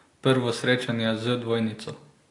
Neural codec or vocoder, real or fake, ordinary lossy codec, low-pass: none; real; AAC, 48 kbps; 10.8 kHz